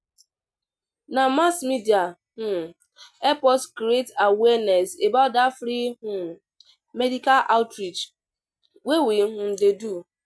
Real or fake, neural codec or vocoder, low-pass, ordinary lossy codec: real; none; none; none